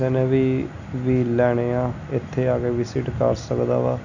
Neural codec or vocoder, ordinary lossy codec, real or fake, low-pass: none; none; real; 7.2 kHz